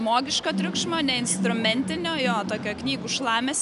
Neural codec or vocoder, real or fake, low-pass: none; real; 10.8 kHz